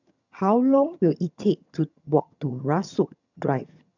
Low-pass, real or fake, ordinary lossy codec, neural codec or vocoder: 7.2 kHz; fake; none; vocoder, 22.05 kHz, 80 mel bands, HiFi-GAN